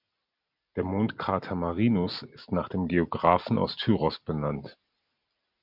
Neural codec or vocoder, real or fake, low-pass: none; real; 5.4 kHz